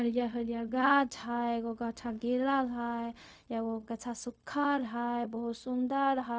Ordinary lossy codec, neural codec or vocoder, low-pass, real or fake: none; codec, 16 kHz, 0.4 kbps, LongCat-Audio-Codec; none; fake